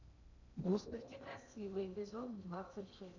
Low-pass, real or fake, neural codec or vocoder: 7.2 kHz; fake; codec, 16 kHz in and 24 kHz out, 0.8 kbps, FocalCodec, streaming, 65536 codes